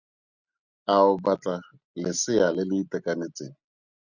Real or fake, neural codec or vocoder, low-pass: real; none; 7.2 kHz